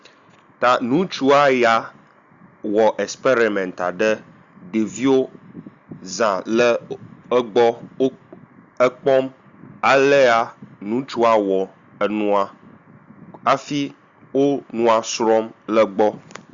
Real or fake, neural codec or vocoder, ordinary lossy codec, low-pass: real; none; Opus, 64 kbps; 7.2 kHz